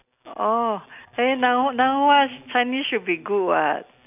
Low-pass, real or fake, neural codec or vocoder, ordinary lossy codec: 3.6 kHz; real; none; none